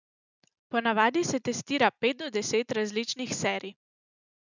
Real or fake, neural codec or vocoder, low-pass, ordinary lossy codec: real; none; 7.2 kHz; none